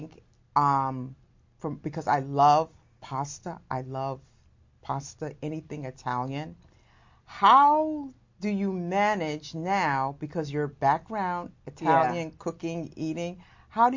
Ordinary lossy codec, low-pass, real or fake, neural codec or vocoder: MP3, 48 kbps; 7.2 kHz; real; none